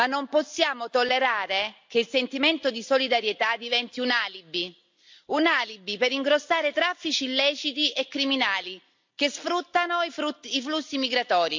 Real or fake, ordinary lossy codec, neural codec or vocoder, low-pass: real; MP3, 64 kbps; none; 7.2 kHz